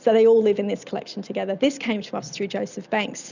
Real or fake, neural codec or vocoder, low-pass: real; none; 7.2 kHz